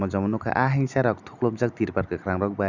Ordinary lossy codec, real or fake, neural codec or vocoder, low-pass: none; real; none; 7.2 kHz